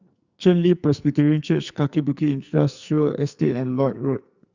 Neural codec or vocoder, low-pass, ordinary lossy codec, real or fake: codec, 44.1 kHz, 2.6 kbps, SNAC; 7.2 kHz; Opus, 64 kbps; fake